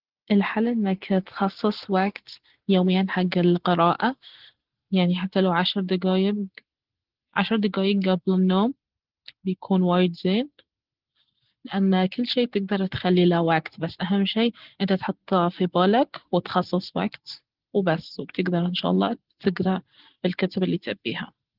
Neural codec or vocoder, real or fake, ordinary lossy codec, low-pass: none; real; Opus, 16 kbps; 5.4 kHz